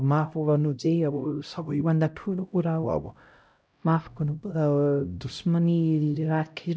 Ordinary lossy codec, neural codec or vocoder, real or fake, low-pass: none; codec, 16 kHz, 0.5 kbps, X-Codec, HuBERT features, trained on LibriSpeech; fake; none